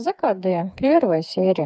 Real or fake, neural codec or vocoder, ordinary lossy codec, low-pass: fake; codec, 16 kHz, 4 kbps, FreqCodec, smaller model; none; none